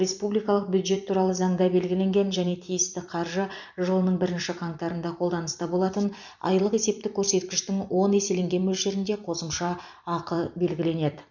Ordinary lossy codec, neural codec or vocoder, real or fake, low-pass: none; vocoder, 44.1 kHz, 128 mel bands every 256 samples, BigVGAN v2; fake; 7.2 kHz